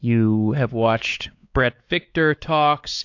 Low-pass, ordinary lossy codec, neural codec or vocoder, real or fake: 7.2 kHz; AAC, 48 kbps; codec, 16 kHz, 4 kbps, X-Codec, WavLM features, trained on Multilingual LibriSpeech; fake